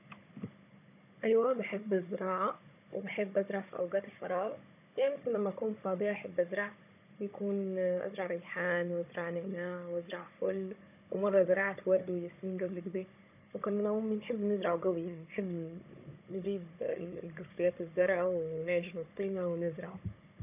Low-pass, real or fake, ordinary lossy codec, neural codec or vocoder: 3.6 kHz; fake; none; codec, 16 kHz, 16 kbps, FunCodec, trained on Chinese and English, 50 frames a second